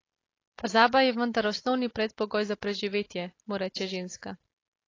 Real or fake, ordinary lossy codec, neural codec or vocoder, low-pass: real; AAC, 32 kbps; none; 7.2 kHz